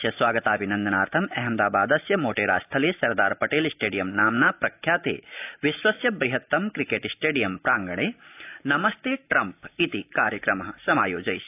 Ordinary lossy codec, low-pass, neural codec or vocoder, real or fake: AAC, 32 kbps; 3.6 kHz; none; real